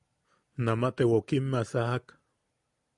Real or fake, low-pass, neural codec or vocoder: real; 10.8 kHz; none